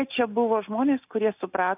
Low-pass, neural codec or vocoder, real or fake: 3.6 kHz; none; real